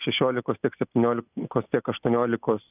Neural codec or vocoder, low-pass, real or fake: none; 3.6 kHz; real